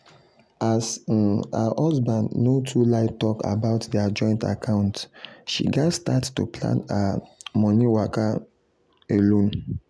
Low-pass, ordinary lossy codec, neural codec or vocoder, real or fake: none; none; none; real